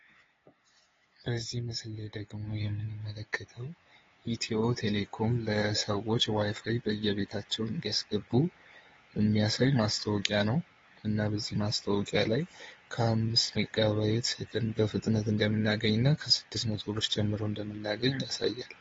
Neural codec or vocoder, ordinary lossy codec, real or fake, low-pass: codec, 16 kHz, 8 kbps, FunCodec, trained on LibriTTS, 25 frames a second; AAC, 24 kbps; fake; 7.2 kHz